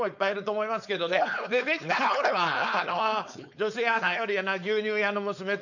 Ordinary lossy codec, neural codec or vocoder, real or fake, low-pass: none; codec, 16 kHz, 4.8 kbps, FACodec; fake; 7.2 kHz